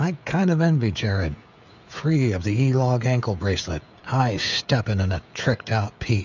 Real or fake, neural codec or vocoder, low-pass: fake; codec, 16 kHz, 16 kbps, FreqCodec, smaller model; 7.2 kHz